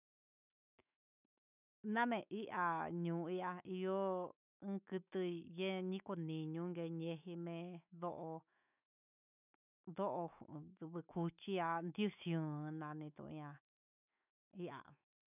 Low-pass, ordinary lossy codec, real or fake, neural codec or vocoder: 3.6 kHz; none; fake; autoencoder, 48 kHz, 128 numbers a frame, DAC-VAE, trained on Japanese speech